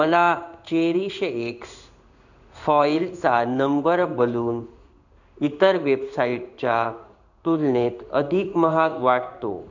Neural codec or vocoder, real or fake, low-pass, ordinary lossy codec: codec, 16 kHz in and 24 kHz out, 1 kbps, XY-Tokenizer; fake; 7.2 kHz; none